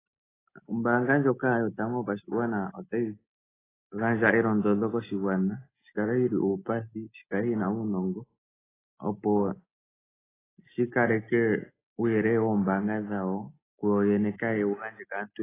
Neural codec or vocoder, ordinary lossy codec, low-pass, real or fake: none; AAC, 16 kbps; 3.6 kHz; real